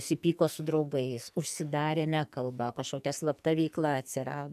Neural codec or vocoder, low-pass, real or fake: codec, 44.1 kHz, 2.6 kbps, SNAC; 14.4 kHz; fake